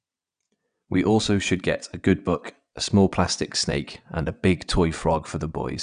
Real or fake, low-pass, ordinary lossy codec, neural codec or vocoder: fake; 9.9 kHz; none; vocoder, 22.05 kHz, 80 mel bands, WaveNeXt